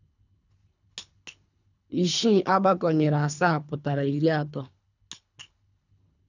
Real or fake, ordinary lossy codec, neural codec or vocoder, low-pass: fake; none; codec, 24 kHz, 3 kbps, HILCodec; 7.2 kHz